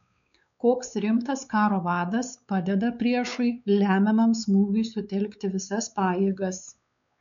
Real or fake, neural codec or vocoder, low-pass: fake; codec, 16 kHz, 4 kbps, X-Codec, WavLM features, trained on Multilingual LibriSpeech; 7.2 kHz